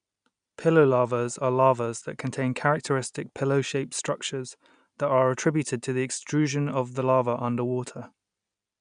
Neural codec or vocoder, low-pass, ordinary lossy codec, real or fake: none; 9.9 kHz; none; real